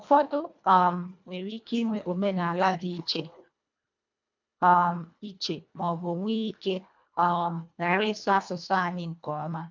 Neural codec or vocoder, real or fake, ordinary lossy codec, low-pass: codec, 24 kHz, 1.5 kbps, HILCodec; fake; MP3, 64 kbps; 7.2 kHz